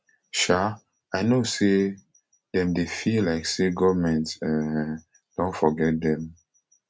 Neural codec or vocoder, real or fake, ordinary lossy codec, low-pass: none; real; none; none